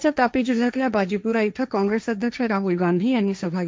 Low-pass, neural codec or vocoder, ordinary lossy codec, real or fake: none; codec, 16 kHz, 1.1 kbps, Voila-Tokenizer; none; fake